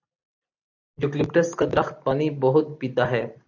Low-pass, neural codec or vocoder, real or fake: 7.2 kHz; vocoder, 44.1 kHz, 128 mel bands every 256 samples, BigVGAN v2; fake